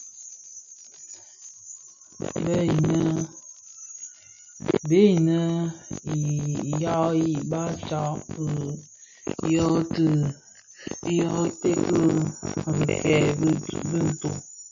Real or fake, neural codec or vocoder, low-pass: real; none; 7.2 kHz